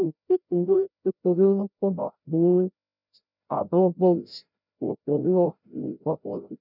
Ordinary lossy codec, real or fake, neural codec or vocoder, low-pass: none; fake; codec, 16 kHz, 0.5 kbps, FreqCodec, larger model; 5.4 kHz